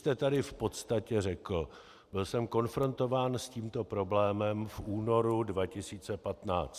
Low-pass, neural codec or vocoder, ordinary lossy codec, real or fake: 14.4 kHz; none; Opus, 64 kbps; real